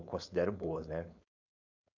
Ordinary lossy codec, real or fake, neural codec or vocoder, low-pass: none; fake; codec, 16 kHz, 4.8 kbps, FACodec; 7.2 kHz